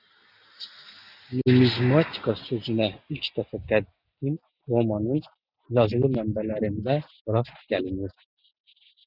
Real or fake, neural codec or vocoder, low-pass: real; none; 5.4 kHz